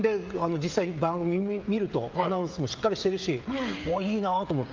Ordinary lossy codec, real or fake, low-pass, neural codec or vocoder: Opus, 32 kbps; fake; 7.2 kHz; codec, 16 kHz, 16 kbps, FreqCodec, smaller model